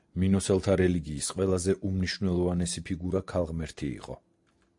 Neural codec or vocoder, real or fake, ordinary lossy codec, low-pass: none; real; AAC, 64 kbps; 10.8 kHz